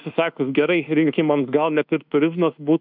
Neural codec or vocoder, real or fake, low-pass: codec, 24 kHz, 1.2 kbps, DualCodec; fake; 5.4 kHz